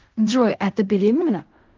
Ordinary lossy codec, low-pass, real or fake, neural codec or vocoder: Opus, 32 kbps; 7.2 kHz; fake; codec, 16 kHz in and 24 kHz out, 0.4 kbps, LongCat-Audio-Codec, fine tuned four codebook decoder